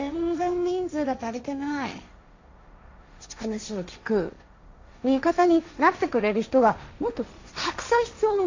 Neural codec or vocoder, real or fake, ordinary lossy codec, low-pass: codec, 16 kHz, 1.1 kbps, Voila-Tokenizer; fake; AAC, 48 kbps; 7.2 kHz